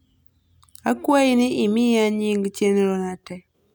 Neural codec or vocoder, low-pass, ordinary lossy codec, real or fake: none; none; none; real